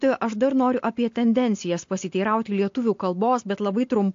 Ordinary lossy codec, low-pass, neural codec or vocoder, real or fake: AAC, 48 kbps; 7.2 kHz; none; real